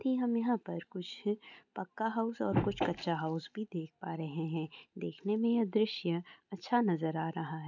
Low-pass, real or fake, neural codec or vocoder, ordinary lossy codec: 7.2 kHz; real; none; none